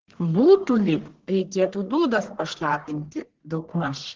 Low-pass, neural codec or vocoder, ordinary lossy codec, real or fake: 7.2 kHz; codec, 44.1 kHz, 1.7 kbps, Pupu-Codec; Opus, 16 kbps; fake